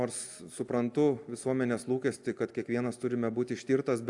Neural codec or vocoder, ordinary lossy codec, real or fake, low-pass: none; AAC, 64 kbps; real; 10.8 kHz